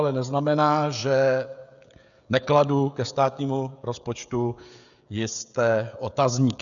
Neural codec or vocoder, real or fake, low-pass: codec, 16 kHz, 16 kbps, FreqCodec, smaller model; fake; 7.2 kHz